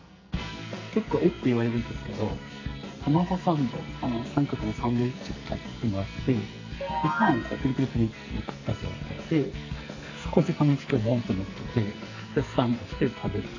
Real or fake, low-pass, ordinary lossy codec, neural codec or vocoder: fake; 7.2 kHz; AAC, 48 kbps; codec, 44.1 kHz, 2.6 kbps, SNAC